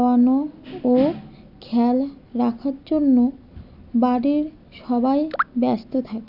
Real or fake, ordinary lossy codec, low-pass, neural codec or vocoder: real; none; 5.4 kHz; none